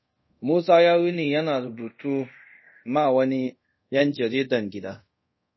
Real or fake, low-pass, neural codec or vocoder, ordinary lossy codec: fake; 7.2 kHz; codec, 24 kHz, 0.5 kbps, DualCodec; MP3, 24 kbps